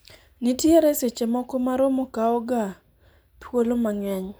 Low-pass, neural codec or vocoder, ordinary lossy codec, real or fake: none; none; none; real